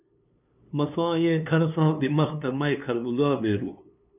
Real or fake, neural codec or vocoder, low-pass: fake; codec, 16 kHz, 2 kbps, FunCodec, trained on LibriTTS, 25 frames a second; 3.6 kHz